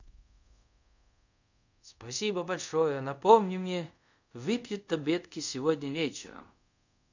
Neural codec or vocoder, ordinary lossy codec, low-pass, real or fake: codec, 24 kHz, 0.5 kbps, DualCodec; none; 7.2 kHz; fake